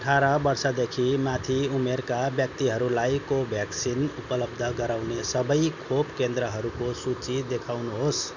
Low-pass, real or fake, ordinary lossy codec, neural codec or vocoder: 7.2 kHz; real; none; none